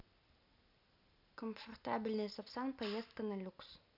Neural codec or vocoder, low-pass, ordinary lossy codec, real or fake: none; 5.4 kHz; none; real